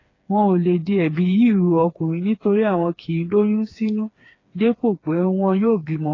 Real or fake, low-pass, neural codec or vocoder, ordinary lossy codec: fake; 7.2 kHz; codec, 16 kHz, 4 kbps, FreqCodec, smaller model; AAC, 32 kbps